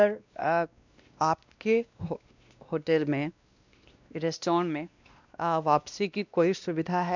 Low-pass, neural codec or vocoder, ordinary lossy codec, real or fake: 7.2 kHz; codec, 16 kHz, 1 kbps, X-Codec, WavLM features, trained on Multilingual LibriSpeech; none; fake